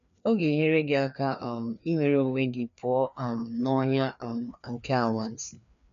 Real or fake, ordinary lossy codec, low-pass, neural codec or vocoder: fake; none; 7.2 kHz; codec, 16 kHz, 2 kbps, FreqCodec, larger model